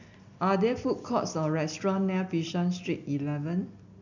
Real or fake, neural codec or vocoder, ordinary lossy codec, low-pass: real; none; none; 7.2 kHz